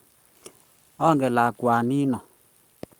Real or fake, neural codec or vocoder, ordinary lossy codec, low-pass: fake; codec, 44.1 kHz, 7.8 kbps, Pupu-Codec; Opus, 32 kbps; 19.8 kHz